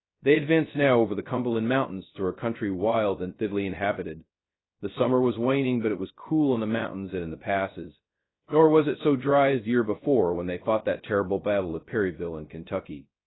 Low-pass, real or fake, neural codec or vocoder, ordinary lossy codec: 7.2 kHz; fake; codec, 16 kHz, 0.2 kbps, FocalCodec; AAC, 16 kbps